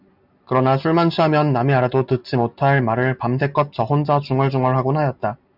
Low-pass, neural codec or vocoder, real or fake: 5.4 kHz; none; real